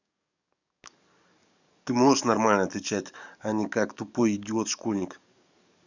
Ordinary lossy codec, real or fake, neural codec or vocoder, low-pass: none; fake; codec, 44.1 kHz, 7.8 kbps, DAC; 7.2 kHz